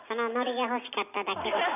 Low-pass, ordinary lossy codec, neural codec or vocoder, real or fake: 3.6 kHz; none; none; real